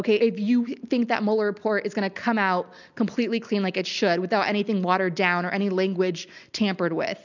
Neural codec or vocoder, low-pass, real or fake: none; 7.2 kHz; real